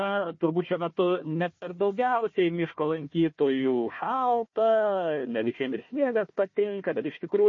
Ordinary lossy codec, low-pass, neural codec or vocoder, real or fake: MP3, 32 kbps; 7.2 kHz; codec, 16 kHz, 1 kbps, FunCodec, trained on Chinese and English, 50 frames a second; fake